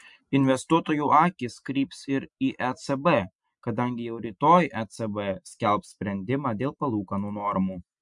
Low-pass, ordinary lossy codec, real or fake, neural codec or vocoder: 10.8 kHz; MP3, 64 kbps; real; none